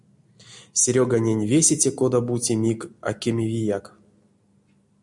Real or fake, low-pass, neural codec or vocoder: real; 10.8 kHz; none